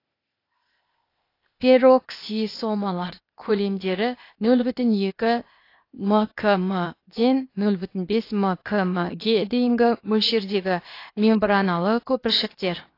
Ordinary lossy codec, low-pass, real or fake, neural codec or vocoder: AAC, 32 kbps; 5.4 kHz; fake; codec, 16 kHz, 0.8 kbps, ZipCodec